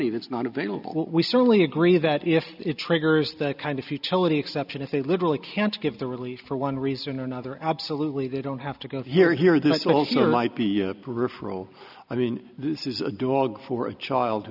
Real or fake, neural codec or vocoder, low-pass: real; none; 5.4 kHz